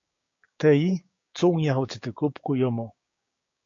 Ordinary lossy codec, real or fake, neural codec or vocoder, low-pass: AAC, 48 kbps; fake; codec, 16 kHz, 6 kbps, DAC; 7.2 kHz